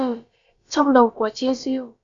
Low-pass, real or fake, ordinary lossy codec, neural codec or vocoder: 7.2 kHz; fake; Opus, 64 kbps; codec, 16 kHz, about 1 kbps, DyCAST, with the encoder's durations